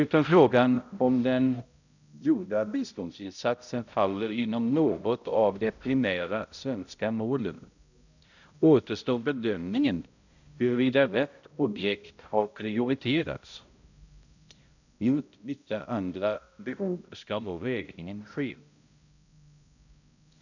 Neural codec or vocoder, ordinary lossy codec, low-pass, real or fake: codec, 16 kHz, 0.5 kbps, X-Codec, HuBERT features, trained on balanced general audio; none; 7.2 kHz; fake